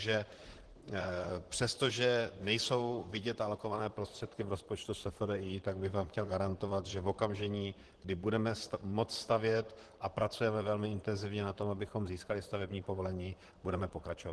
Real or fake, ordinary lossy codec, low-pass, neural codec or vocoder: fake; Opus, 16 kbps; 10.8 kHz; vocoder, 44.1 kHz, 128 mel bands, Pupu-Vocoder